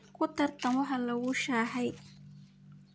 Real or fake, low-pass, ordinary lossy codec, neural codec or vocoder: real; none; none; none